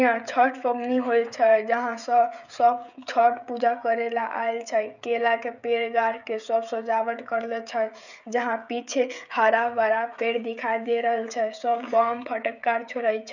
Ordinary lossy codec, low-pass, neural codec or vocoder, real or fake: none; 7.2 kHz; codec, 16 kHz, 16 kbps, FreqCodec, smaller model; fake